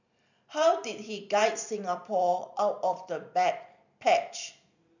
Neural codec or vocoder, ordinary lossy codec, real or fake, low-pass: vocoder, 44.1 kHz, 128 mel bands every 512 samples, BigVGAN v2; none; fake; 7.2 kHz